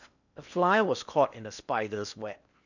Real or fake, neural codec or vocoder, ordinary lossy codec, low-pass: fake; codec, 16 kHz in and 24 kHz out, 0.8 kbps, FocalCodec, streaming, 65536 codes; none; 7.2 kHz